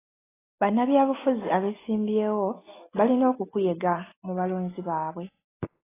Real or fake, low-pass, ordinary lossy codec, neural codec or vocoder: real; 3.6 kHz; AAC, 16 kbps; none